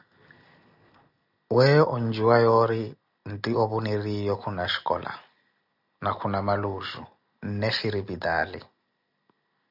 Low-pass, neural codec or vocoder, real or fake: 5.4 kHz; none; real